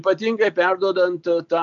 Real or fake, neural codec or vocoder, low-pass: real; none; 7.2 kHz